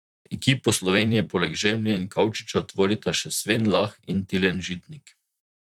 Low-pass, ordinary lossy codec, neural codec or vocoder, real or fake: 19.8 kHz; none; vocoder, 44.1 kHz, 128 mel bands, Pupu-Vocoder; fake